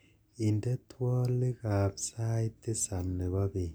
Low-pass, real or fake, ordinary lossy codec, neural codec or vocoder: none; real; none; none